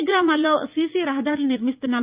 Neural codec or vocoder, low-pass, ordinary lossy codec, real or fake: vocoder, 44.1 kHz, 80 mel bands, Vocos; 3.6 kHz; Opus, 24 kbps; fake